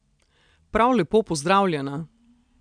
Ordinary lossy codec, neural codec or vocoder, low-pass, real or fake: MP3, 96 kbps; none; 9.9 kHz; real